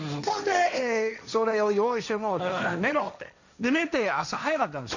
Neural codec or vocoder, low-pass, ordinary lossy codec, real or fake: codec, 16 kHz, 1.1 kbps, Voila-Tokenizer; 7.2 kHz; none; fake